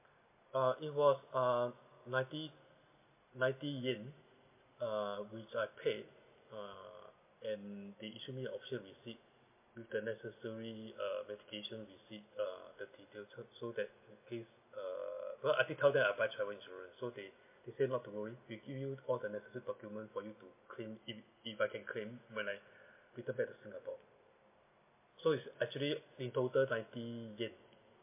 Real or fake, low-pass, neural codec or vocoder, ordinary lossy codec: real; 3.6 kHz; none; MP3, 24 kbps